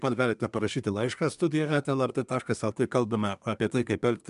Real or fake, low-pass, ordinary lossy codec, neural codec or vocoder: fake; 10.8 kHz; MP3, 96 kbps; codec, 24 kHz, 1 kbps, SNAC